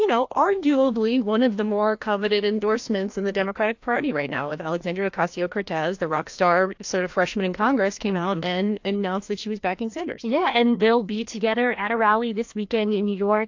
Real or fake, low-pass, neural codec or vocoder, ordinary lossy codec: fake; 7.2 kHz; codec, 16 kHz, 1 kbps, FreqCodec, larger model; AAC, 48 kbps